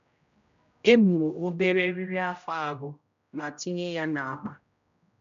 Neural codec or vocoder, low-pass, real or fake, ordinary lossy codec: codec, 16 kHz, 0.5 kbps, X-Codec, HuBERT features, trained on general audio; 7.2 kHz; fake; MP3, 64 kbps